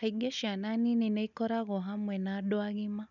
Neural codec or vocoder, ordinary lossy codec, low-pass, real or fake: none; none; 7.2 kHz; real